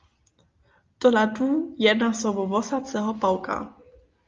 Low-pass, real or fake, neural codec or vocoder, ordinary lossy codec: 7.2 kHz; real; none; Opus, 32 kbps